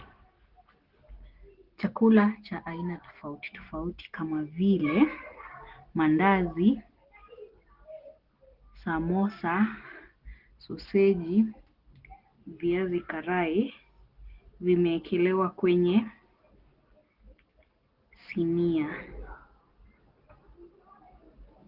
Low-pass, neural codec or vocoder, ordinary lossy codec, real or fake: 5.4 kHz; none; Opus, 16 kbps; real